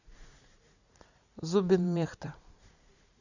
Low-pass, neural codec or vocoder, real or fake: 7.2 kHz; vocoder, 44.1 kHz, 80 mel bands, Vocos; fake